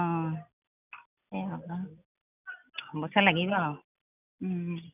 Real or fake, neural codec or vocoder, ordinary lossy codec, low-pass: real; none; none; 3.6 kHz